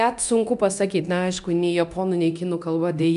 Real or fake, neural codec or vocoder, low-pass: fake; codec, 24 kHz, 0.9 kbps, DualCodec; 10.8 kHz